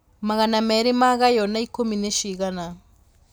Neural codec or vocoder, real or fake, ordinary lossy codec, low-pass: none; real; none; none